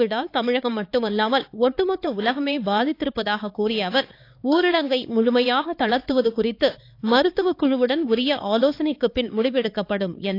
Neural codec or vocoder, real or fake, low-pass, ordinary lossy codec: codec, 16 kHz, 4 kbps, X-Codec, HuBERT features, trained on LibriSpeech; fake; 5.4 kHz; AAC, 32 kbps